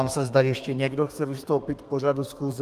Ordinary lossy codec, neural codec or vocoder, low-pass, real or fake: Opus, 32 kbps; codec, 32 kHz, 1.9 kbps, SNAC; 14.4 kHz; fake